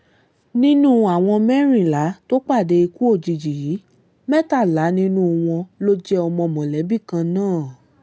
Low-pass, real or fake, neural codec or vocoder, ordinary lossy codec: none; real; none; none